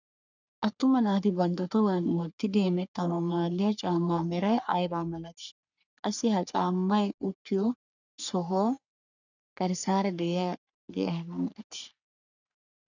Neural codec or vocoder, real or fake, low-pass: codec, 44.1 kHz, 3.4 kbps, Pupu-Codec; fake; 7.2 kHz